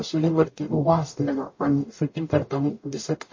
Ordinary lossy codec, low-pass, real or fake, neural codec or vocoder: MP3, 32 kbps; 7.2 kHz; fake; codec, 44.1 kHz, 0.9 kbps, DAC